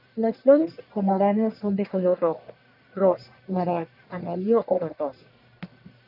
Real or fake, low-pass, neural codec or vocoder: fake; 5.4 kHz; codec, 44.1 kHz, 1.7 kbps, Pupu-Codec